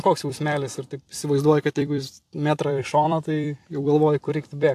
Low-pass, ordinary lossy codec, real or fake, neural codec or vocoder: 14.4 kHz; AAC, 64 kbps; fake; vocoder, 44.1 kHz, 128 mel bands every 256 samples, BigVGAN v2